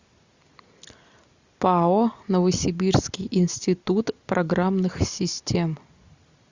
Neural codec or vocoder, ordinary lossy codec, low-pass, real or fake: none; Opus, 64 kbps; 7.2 kHz; real